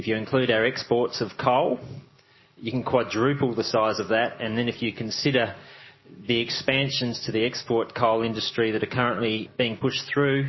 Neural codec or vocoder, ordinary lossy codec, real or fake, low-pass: none; MP3, 24 kbps; real; 7.2 kHz